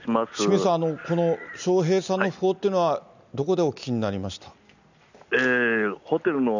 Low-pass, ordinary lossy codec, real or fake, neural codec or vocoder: 7.2 kHz; none; real; none